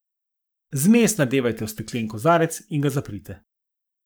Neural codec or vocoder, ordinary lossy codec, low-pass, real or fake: codec, 44.1 kHz, 7.8 kbps, Pupu-Codec; none; none; fake